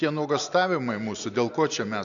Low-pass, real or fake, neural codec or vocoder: 7.2 kHz; real; none